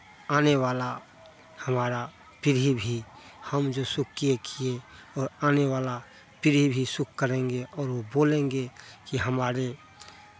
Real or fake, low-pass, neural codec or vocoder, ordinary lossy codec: real; none; none; none